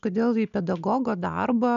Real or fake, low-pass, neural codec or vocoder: real; 7.2 kHz; none